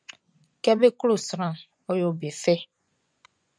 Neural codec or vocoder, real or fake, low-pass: vocoder, 24 kHz, 100 mel bands, Vocos; fake; 9.9 kHz